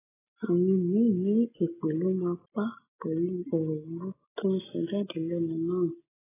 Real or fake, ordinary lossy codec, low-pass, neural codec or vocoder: real; AAC, 16 kbps; 3.6 kHz; none